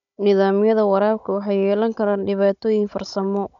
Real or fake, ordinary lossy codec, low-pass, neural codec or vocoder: fake; none; 7.2 kHz; codec, 16 kHz, 16 kbps, FunCodec, trained on Chinese and English, 50 frames a second